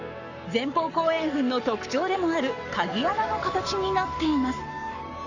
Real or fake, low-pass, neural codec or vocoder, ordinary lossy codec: fake; 7.2 kHz; codec, 44.1 kHz, 7.8 kbps, Pupu-Codec; none